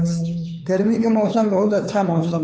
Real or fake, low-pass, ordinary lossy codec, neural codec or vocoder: fake; none; none; codec, 16 kHz, 4 kbps, X-Codec, HuBERT features, trained on LibriSpeech